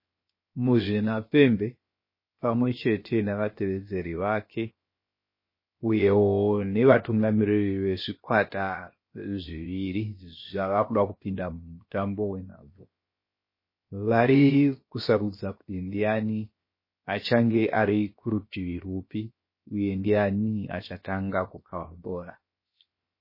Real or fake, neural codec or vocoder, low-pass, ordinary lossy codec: fake; codec, 16 kHz, 0.7 kbps, FocalCodec; 5.4 kHz; MP3, 24 kbps